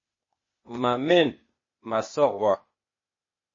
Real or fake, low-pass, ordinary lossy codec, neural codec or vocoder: fake; 7.2 kHz; MP3, 32 kbps; codec, 16 kHz, 0.8 kbps, ZipCodec